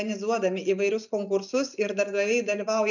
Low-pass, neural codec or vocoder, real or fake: 7.2 kHz; none; real